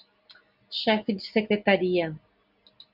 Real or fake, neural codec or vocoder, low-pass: real; none; 5.4 kHz